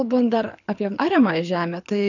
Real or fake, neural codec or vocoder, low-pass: fake; codec, 24 kHz, 6 kbps, HILCodec; 7.2 kHz